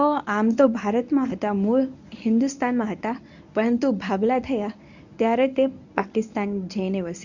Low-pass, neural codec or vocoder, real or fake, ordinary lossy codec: 7.2 kHz; codec, 24 kHz, 0.9 kbps, WavTokenizer, medium speech release version 1; fake; none